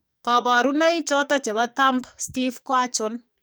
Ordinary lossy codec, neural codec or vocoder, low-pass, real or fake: none; codec, 44.1 kHz, 2.6 kbps, SNAC; none; fake